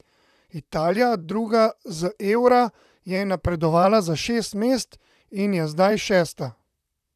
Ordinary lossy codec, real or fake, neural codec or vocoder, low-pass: none; fake; vocoder, 44.1 kHz, 128 mel bands, Pupu-Vocoder; 14.4 kHz